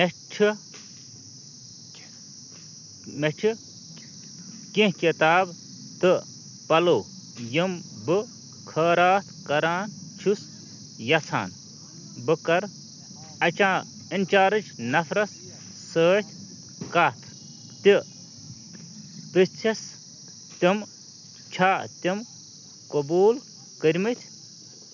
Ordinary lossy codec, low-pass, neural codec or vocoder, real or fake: none; 7.2 kHz; none; real